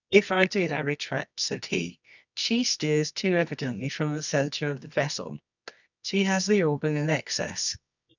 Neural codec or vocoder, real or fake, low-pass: codec, 24 kHz, 0.9 kbps, WavTokenizer, medium music audio release; fake; 7.2 kHz